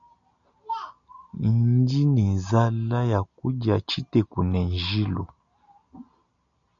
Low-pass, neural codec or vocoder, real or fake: 7.2 kHz; none; real